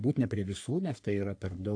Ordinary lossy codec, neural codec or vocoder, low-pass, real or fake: AAC, 48 kbps; codec, 44.1 kHz, 3.4 kbps, Pupu-Codec; 9.9 kHz; fake